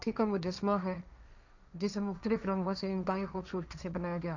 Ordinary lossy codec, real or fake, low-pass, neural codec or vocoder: none; fake; 7.2 kHz; codec, 16 kHz, 1.1 kbps, Voila-Tokenizer